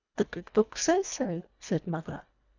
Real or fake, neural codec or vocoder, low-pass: fake; codec, 24 kHz, 1.5 kbps, HILCodec; 7.2 kHz